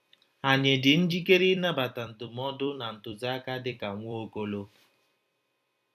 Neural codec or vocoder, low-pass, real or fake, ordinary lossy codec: vocoder, 44.1 kHz, 128 mel bands every 256 samples, BigVGAN v2; 14.4 kHz; fake; none